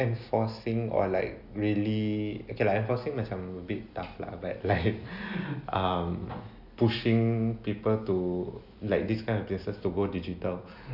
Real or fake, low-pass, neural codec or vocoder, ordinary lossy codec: real; 5.4 kHz; none; none